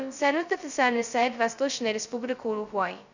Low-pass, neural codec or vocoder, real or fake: 7.2 kHz; codec, 16 kHz, 0.2 kbps, FocalCodec; fake